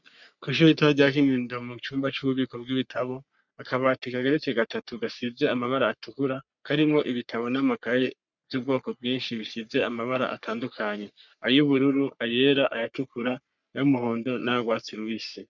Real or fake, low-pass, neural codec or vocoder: fake; 7.2 kHz; codec, 44.1 kHz, 3.4 kbps, Pupu-Codec